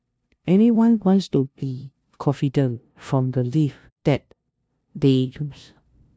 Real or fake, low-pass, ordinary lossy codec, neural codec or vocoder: fake; none; none; codec, 16 kHz, 0.5 kbps, FunCodec, trained on LibriTTS, 25 frames a second